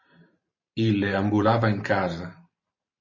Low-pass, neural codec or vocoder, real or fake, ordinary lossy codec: 7.2 kHz; vocoder, 44.1 kHz, 128 mel bands every 512 samples, BigVGAN v2; fake; MP3, 32 kbps